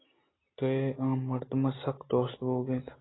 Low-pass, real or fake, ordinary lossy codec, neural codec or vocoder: 7.2 kHz; real; AAC, 16 kbps; none